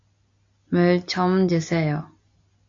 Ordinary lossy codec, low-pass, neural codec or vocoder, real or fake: AAC, 64 kbps; 7.2 kHz; none; real